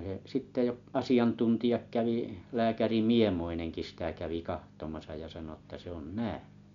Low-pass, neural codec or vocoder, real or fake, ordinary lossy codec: 7.2 kHz; none; real; MP3, 64 kbps